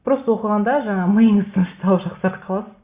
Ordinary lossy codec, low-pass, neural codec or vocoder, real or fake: Opus, 64 kbps; 3.6 kHz; none; real